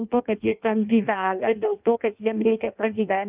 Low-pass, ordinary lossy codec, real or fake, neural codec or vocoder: 3.6 kHz; Opus, 24 kbps; fake; codec, 16 kHz in and 24 kHz out, 0.6 kbps, FireRedTTS-2 codec